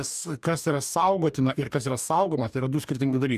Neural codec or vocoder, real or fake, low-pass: codec, 44.1 kHz, 2.6 kbps, DAC; fake; 14.4 kHz